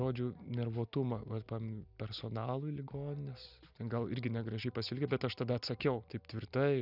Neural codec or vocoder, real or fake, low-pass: none; real; 5.4 kHz